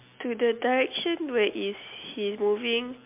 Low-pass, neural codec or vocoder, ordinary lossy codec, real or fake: 3.6 kHz; none; MP3, 32 kbps; real